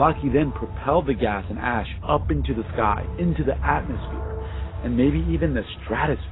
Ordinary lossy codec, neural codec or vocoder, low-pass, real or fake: AAC, 16 kbps; none; 7.2 kHz; real